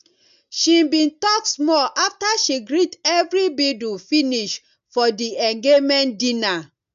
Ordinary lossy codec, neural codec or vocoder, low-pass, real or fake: none; none; 7.2 kHz; real